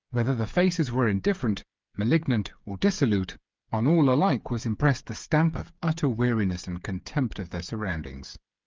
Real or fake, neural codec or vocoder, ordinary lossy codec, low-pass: fake; codec, 16 kHz, 8 kbps, FreqCodec, smaller model; Opus, 24 kbps; 7.2 kHz